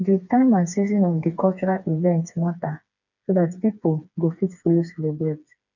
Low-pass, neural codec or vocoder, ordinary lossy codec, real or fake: 7.2 kHz; codec, 16 kHz, 4 kbps, FreqCodec, smaller model; none; fake